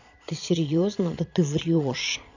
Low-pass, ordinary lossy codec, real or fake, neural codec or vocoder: 7.2 kHz; none; fake; vocoder, 22.05 kHz, 80 mel bands, Vocos